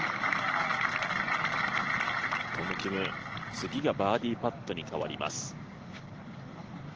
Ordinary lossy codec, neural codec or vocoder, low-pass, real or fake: Opus, 16 kbps; none; 7.2 kHz; real